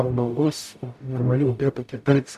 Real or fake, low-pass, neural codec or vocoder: fake; 14.4 kHz; codec, 44.1 kHz, 0.9 kbps, DAC